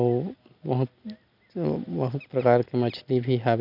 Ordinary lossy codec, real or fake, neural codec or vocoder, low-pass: none; real; none; 5.4 kHz